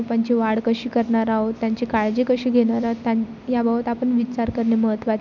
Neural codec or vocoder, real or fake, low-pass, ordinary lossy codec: none; real; 7.2 kHz; none